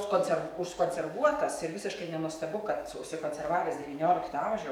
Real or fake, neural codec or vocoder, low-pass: fake; codec, 44.1 kHz, 7.8 kbps, DAC; 19.8 kHz